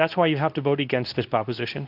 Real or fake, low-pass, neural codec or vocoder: fake; 5.4 kHz; codec, 24 kHz, 0.9 kbps, WavTokenizer, medium speech release version 2